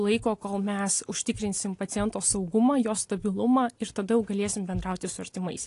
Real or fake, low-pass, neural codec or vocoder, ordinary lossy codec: real; 10.8 kHz; none; AAC, 48 kbps